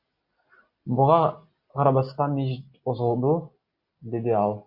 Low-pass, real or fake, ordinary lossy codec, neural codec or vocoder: 5.4 kHz; real; Opus, 64 kbps; none